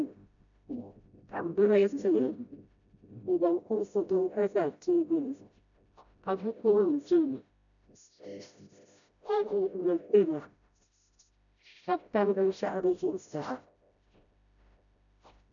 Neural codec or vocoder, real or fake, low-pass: codec, 16 kHz, 0.5 kbps, FreqCodec, smaller model; fake; 7.2 kHz